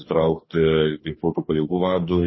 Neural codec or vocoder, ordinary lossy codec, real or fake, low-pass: codec, 44.1 kHz, 2.6 kbps, DAC; MP3, 24 kbps; fake; 7.2 kHz